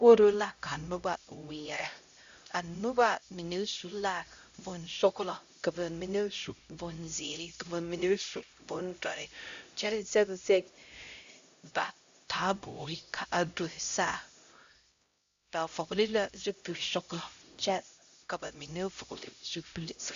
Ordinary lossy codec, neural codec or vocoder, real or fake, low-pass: Opus, 64 kbps; codec, 16 kHz, 0.5 kbps, X-Codec, HuBERT features, trained on LibriSpeech; fake; 7.2 kHz